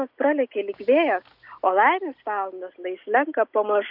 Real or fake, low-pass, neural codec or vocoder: real; 5.4 kHz; none